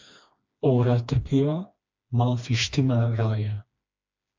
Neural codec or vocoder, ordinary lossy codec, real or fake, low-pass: codec, 16 kHz, 2 kbps, FreqCodec, smaller model; MP3, 64 kbps; fake; 7.2 kHz